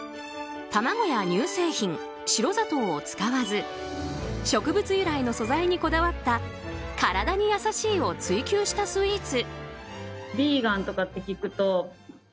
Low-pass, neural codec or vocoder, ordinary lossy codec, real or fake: none; none; none; real